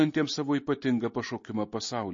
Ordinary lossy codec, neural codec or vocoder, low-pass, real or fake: MP3, 32 kbps; none; 7.2 kHz; real